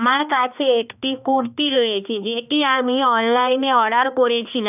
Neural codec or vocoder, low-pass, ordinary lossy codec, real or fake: codec, 16 kHz, 2 kbps, X-Codec, HuBERT features, trained on balanced general audio; 3.6 kHz; none; fake